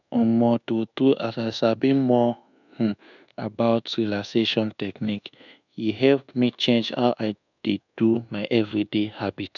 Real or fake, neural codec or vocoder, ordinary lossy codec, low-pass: fake; codec, 24 kHz, 1.2 kbps, DualCodec; none; 7.2 kHz